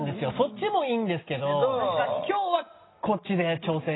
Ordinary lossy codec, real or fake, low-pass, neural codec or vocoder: AAC, 16 kbps; real; 7.2 kHz; none